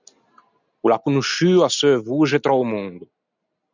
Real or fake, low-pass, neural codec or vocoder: real; 7.2 kHz; none